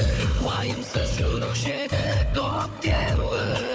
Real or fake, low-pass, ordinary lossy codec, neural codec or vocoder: fake; none; none; codec, 16 kHz, 4 kbps, FunCodec, trained on Chinese and English, 50 frames a second